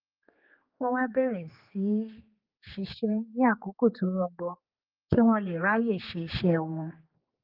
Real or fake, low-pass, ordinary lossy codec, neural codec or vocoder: fake; 5.4 kHz; Opus, 24 kbps; codec, 16 kHz, 4 kbps, X-Codec, HuBERT features, trained on general audio